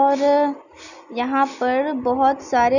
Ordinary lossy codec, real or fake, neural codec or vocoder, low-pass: none; real; none; 7.2 kHz